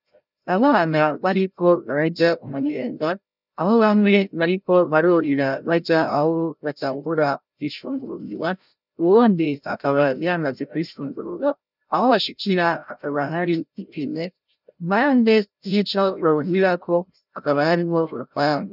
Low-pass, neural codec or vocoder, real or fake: 5.4 kHz; codec, 16 kHz, 0.5 kbps, FreqCodec, larger model; fake